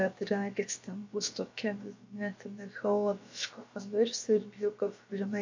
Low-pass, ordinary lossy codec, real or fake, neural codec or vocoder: 7.2 kHz; MP3, 48 kbps; fake; codec, 16 kHz, about 1 kbps, DyCAST, with the encoder's durations